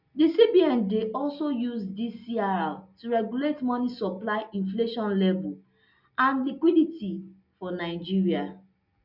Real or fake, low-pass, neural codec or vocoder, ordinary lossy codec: real; 5.4 kHz; none; none